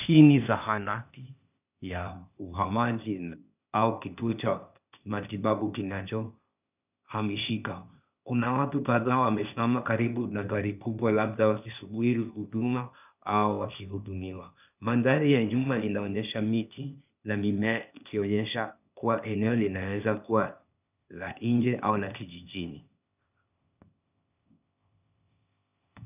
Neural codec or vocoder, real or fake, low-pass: codec, 16 kHz, 0.8 kbps, ZipCodec; fake; 3.6 kHz